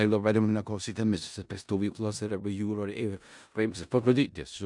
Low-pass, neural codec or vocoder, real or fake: 10.8 kHz; codec, 16 kHz in and 24 kHz out, 0.4 kbps, LongCat-Audio-Codec, four codebook decoder; fake